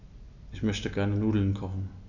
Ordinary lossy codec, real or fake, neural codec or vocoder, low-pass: none; real; none; 7.2 kHz